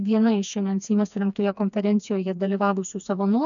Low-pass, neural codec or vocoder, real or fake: 7.2 kHz; codec, 16 kHz, 2 kbps, FreqCodec, smaller model; fake